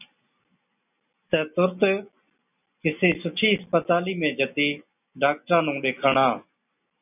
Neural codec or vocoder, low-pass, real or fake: none; 3.6 kHz; real